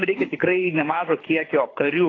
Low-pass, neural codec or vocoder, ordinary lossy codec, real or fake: 7.2 kHz; codec, 24 kHz, 6 kbps, HILCodec; AAC, 32 kbps; fake